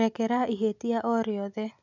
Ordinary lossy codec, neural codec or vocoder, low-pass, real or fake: none; none; 7.2 kHz; real